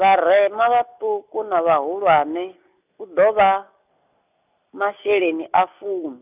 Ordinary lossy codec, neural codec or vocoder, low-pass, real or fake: none; none; 3.6 kHz; real